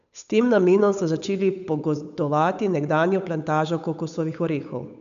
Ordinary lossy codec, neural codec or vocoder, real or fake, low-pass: MP3, 96 kbps; codec, 16 kHz, 8 kbps, FunCodec, trained on Chinese and English, 25 frames a second; fake; 7.2 kHz